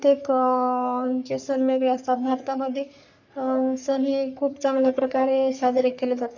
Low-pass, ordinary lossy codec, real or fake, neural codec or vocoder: 7.2 kHz; none; fake; codec, 44.1 kHz, 3.4 kbps, Pupu-Codec